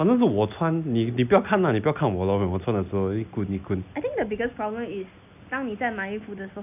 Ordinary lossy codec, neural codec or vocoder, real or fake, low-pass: none; none; real; 3.6 kHz